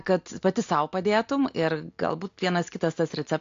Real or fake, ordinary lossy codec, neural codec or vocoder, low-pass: real; AAC, 48 kbps; none; 7.2 kHz